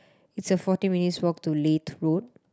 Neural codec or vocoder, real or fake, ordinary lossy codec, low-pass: none; real; none; none